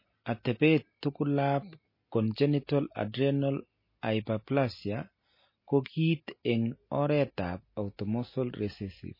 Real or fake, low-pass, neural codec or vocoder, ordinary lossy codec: real; 5.4 kHz; none; MP3, 24 kbps